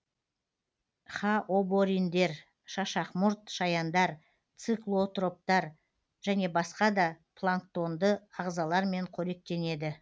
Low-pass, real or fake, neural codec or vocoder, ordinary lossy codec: none; real; none; none